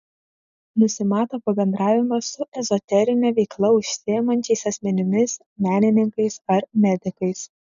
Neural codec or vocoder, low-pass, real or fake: none; 7.2 kHz; real